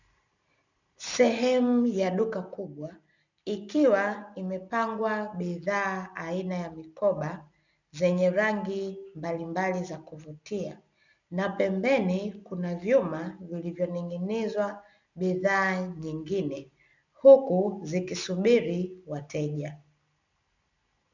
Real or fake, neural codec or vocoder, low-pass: real; none; 7.2 kHz